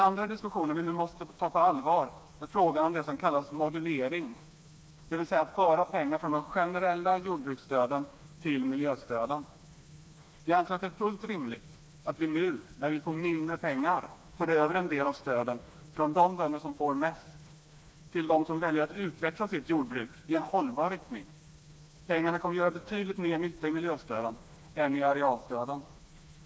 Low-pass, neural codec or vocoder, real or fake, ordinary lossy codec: none; codec, 16 kHz, 2 kbps, FreqCodec, smaller model; fake; none